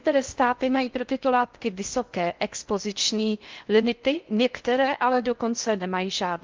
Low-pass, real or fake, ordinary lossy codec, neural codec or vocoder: 7.2 kHz; fake; Opus, 24 kbps; codec, 16 kHz in and 24 kHz out, 0.6 kbps, FocalCodec, streaming, 4096 codes